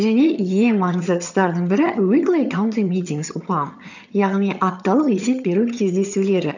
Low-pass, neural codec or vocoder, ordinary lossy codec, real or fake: 7.2 kHz; vocoder, 22.05 kHz, 80 mel bands, HiFi-GAN; none; fake